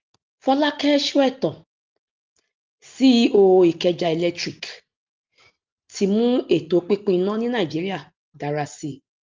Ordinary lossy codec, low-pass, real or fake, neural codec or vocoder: Opus, 32 kbps; 7.2 kHz; real; none